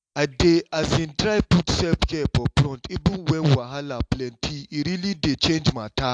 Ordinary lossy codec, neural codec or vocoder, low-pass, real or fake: AAC, 64 kbps; none; 9.9 kHz; real